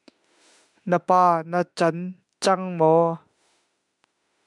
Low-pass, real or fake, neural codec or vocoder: 10.8 kHz; fake; autoencoder, 48 kHz, 32 numbers a frame, DAC-VAE, trained on Japanese speech